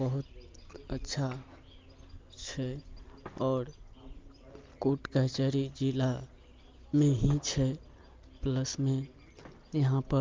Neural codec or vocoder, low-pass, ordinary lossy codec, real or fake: none; 7.2 kHz; Opus, 32 kbps; real